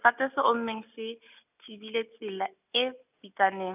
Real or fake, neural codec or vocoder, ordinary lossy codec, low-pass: real; none; none; 3.6 kHz